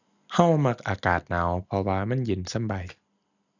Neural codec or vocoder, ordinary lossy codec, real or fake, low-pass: none; none; real; 7.2 kHz